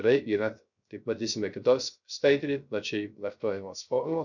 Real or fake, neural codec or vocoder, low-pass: fake; codec, 16 kHz, 0.3 kbps, FocalCodec; 7.2 kHz